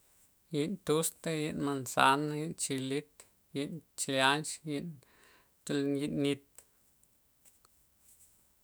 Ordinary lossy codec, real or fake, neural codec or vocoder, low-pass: none; fake; autoencoder, 48 kHz, 128 numbers a frame, DAC-VAE, trained on Japanese speech; none